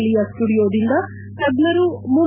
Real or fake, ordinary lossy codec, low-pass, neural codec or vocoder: real; none; 3.6 kHz; none